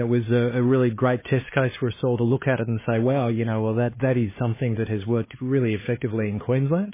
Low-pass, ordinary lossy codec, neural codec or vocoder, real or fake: 3.6 kHz; MP3, 16 kbps; codec, 16 kHz, 2 kbps, X-Codec, HuBERT features, trained on LibriSpeech; fake